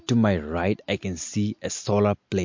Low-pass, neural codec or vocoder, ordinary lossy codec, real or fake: 7.2 kHz; none; MP3, 48 kbps; real